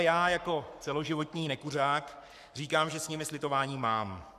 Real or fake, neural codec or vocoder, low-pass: fake; codec, 44.1 kHz, 7.8 kbps, Pupu-Codec; 14.4 kHz